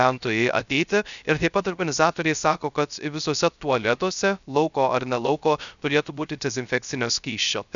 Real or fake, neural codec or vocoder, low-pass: fake; codec, 16 kHz, 0.3 kbps, FocalCodec; 7.2 kHz